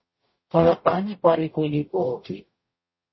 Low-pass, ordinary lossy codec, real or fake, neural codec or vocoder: 7.2 kHz; MP3, 24 kbps; fake; codec, 44.1 kHz, 0.9 kbps, DAC